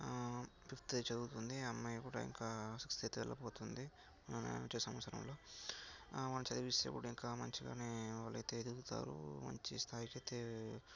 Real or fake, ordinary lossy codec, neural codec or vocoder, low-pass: real; none; none; 7.2 kHz